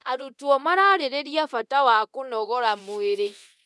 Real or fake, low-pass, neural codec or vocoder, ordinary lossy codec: fake; none; codec, 24 kHz, 0.9 kbps, DualCodec; none